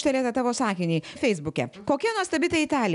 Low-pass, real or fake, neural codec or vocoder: 10.8 kHz; real; none